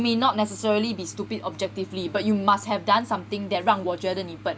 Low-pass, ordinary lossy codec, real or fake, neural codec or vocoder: none; none; real; none